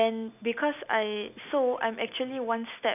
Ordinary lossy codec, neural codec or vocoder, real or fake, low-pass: none; none; real; 3.6 kHz